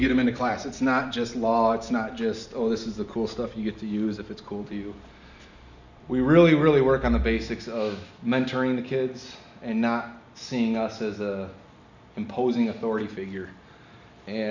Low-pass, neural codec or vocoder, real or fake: 7.2 kHz; none; real